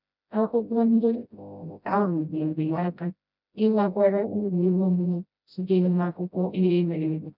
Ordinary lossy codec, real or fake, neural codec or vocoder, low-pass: none; fake; codec, 16 kHz, 0.5 kbps, FreqCodec, smaller model; 5.4 kHz